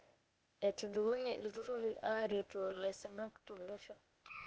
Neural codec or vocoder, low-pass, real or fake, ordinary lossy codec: codec, 16 kHz, 0.8 kbps, ZipCodec; none; fake; none